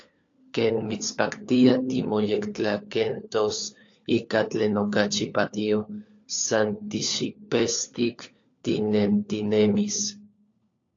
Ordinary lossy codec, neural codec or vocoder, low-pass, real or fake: AAC, 48 kbps; codec, 16 kHz, 4 kbps, FunCodec, trained on LibriTTS, 50 frames a second; 7.2 kHz; fake